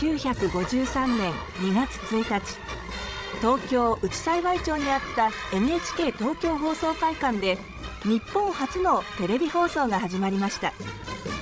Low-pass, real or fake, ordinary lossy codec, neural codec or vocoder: none; fake; none; codec, 16 kHz, 16 kbps, FreqCodec, larger model